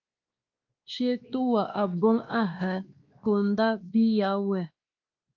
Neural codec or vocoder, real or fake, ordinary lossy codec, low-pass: codec, 16 kHz, 2 kbps, X-Codec, WavLM features, trained on Multilingual LibriSpeech; fake; Opus, 32 kbps; 7.2 kHz